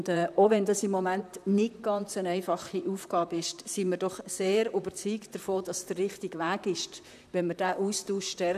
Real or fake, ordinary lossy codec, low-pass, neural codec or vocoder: fake; none; 14.4 kHz; vocoder, 44.1 kHz, 128 mel bands, Pupu-Vocoder